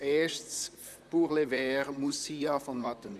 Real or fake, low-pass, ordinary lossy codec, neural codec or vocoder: fake; 14.4 kHz; none; vocoder, 44.1 kHz, 128 mel bands, Pupu-Vocoder